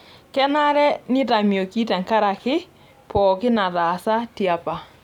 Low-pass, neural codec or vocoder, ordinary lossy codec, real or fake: 19.8 kHz; vocoder, 44.1 kHz, 128 mel bands every 256 samples, BigVGAN v2; none; fake